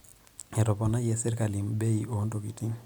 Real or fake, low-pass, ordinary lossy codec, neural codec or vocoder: real; none; none; none